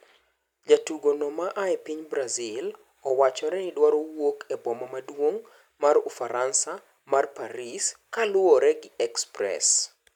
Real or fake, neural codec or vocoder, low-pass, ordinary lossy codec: real; none; 19.8 kHz; none